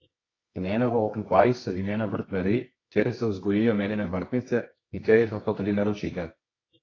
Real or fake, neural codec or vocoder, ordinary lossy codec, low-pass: fake; codec, 24 kHz, 0.9 kbps, WavTokenizer, medium music audio release; AAC, 32 kbps; 7.2 kHz